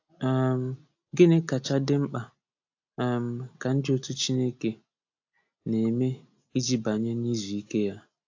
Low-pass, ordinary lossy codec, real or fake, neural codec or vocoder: 7.2 kHz; AAC, 48 kbps; real; none